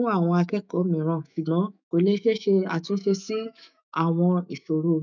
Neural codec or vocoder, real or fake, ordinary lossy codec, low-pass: autoencoder, 48 kHz, 128 numbers a frame, DAC-VAE, trained on Japanese speech; fake; none; 7.2 kHz